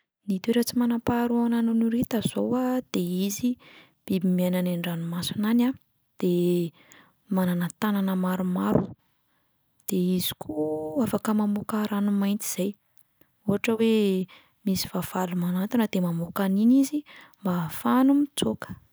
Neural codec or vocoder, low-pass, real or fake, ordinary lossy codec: none; none; real; none